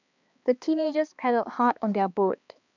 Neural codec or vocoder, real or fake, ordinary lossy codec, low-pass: codec, 16 kHz, 2 kbps, X-Codec, HuBERT features, trained on balanced general audio; fake; none; 7.2 kHz